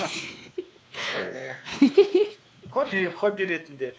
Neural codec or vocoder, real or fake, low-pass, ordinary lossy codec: codec, 16 kHz, 2 kbps, X-Codec, WavLM features, trained on Multilingual LibriSpeech; fake; none; none